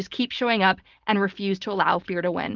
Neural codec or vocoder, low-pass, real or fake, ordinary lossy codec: none; 7.2 kHz; real; Opus, 24 kbps